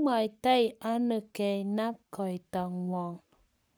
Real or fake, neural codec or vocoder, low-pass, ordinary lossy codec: fake; codec, 44.1 kHz, 7.8 kbps, Pupu-Codec; none; none